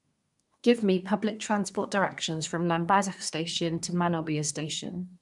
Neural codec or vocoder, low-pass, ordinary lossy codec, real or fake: codec, 24 kHz, 1 kbps, SNAC; 10.8 kHz; none; fake